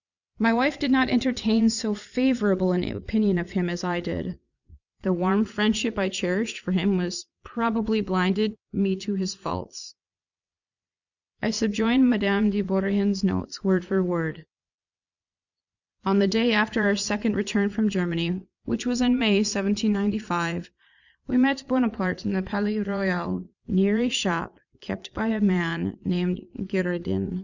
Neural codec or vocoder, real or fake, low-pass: vocoder, 22.05 kHz, 80 mel bands, Vocos; fake; 7.2 kHz